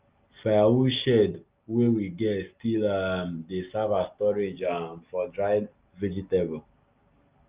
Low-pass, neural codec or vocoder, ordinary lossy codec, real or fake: 3.6 kHz; none; Opus, 32 kbps; real